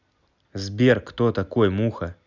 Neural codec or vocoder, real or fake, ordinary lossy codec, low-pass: none; real; none; 7.2 kHz